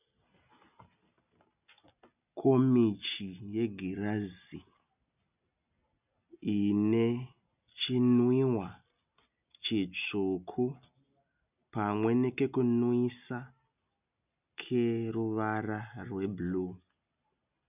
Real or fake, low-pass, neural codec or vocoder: real; 3.6 kHz; none